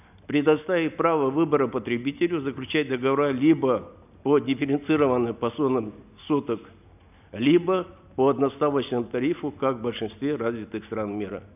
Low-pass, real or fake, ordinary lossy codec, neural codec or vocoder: 3.6 kHz; real; none; none